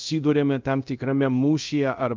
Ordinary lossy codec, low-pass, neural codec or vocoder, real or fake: Opus, 24 kbps; 7.2 kHz; codec, 24 kHz, 0.5 kbps, DualCodec; fake